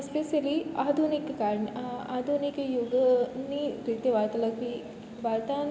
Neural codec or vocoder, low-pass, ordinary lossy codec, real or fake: none; none; none; real